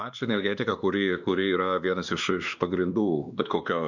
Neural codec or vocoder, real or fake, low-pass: codec, 16 kHz, 2 kbps, X-Codec, WavLM features, trained on Multilingual LibriSpeech; fake; 7.2 kHz